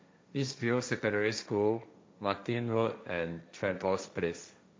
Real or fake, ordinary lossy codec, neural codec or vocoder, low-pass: fake; none; codec, 16 kHz, 1.1 kbps, Voila-Tokenizer; none